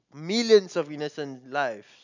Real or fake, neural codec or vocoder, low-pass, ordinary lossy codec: real; none; 7.2 kHz; none